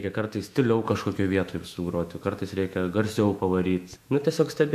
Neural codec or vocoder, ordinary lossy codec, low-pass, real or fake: autoencoder, 48 kHz, 128 numbers a frame, DAC-VAE, trained on Japanese speech; AAC, 96 kbps; 14.4 kHz; fake